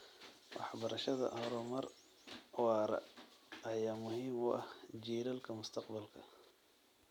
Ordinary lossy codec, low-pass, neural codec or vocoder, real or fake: none; 19.8 kHz; none; real